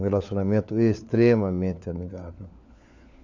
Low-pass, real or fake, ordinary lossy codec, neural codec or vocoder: 7.2 kHz; fake; none; codec, 16 kHz, 4 kbps, FunCodec, trained on LibriTTS, 50 frames a second